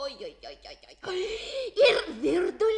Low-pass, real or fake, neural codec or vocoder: 10.8 kHz; real; none